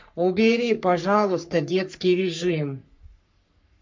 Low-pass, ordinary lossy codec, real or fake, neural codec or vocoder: 7.2 kHz; MP3, 48 kbps; fake; codec, 44.1 kHz, 3.4 kbps, Pupu-Codec